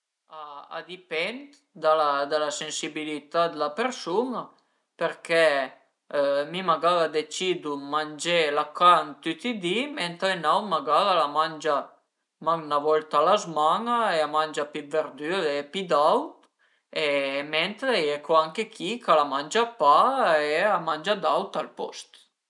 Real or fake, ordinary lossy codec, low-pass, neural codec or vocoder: real; none; 10.8 kHz; none